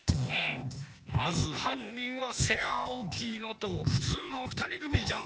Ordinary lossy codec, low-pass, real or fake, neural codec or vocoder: none; none; fake; codec, 16 kHz, 0.8 kbps, ZipCodec